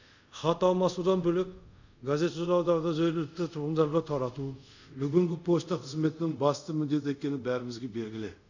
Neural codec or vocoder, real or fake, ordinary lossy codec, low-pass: codec, 24 kHz, 0.5 kbps, DualCodec; fake; none; 7.2 kHz